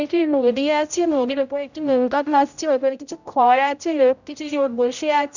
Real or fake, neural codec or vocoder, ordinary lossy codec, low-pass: fake; codec, 16 kHz, 0.5 kbps, X-Codec, HuBERT features, trained on general audio; none; 7.2 kHz